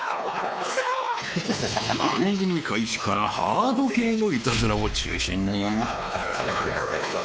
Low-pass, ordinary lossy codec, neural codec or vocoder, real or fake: none; none; codec, 16 kHz, 2 kbps, X-Codec, WavLM features, trained on Multilingual LibriSpeech; fake